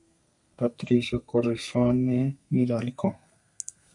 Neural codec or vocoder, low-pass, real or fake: codec, 32 kHz, 1.9 kbps, SNAC; 10.8 kHz; fake